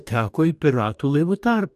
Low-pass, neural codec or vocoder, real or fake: 14.4 kHz; codec, 44.1 kHz, 2.6 kbps, DAC; fake